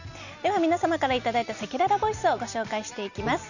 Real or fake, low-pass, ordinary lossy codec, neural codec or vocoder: real; 7.2 kHz; none; none